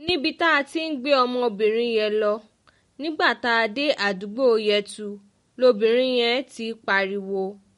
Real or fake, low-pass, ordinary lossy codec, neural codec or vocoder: real; 19.8 kHz; MP3, 48 kbps; none